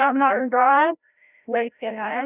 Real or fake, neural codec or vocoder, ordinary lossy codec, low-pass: fake; codec, 16 kHz, 0.5 kbps, FreqCodec, larger model; none; 3.6 kHz